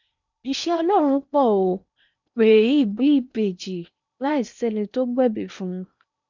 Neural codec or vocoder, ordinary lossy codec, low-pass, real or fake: codec, 16 kHz in and 24 kHz out, 0.8 kbps, FocalCodec, streaming, 65536 codes; none; 7.2 kHz; fake